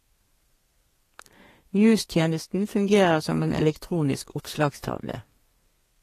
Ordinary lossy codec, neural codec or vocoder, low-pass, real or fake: AAC, 48 kbps; codec, 32 kHz, 1.9 kbps, SNAC; 14.4 kHz; fake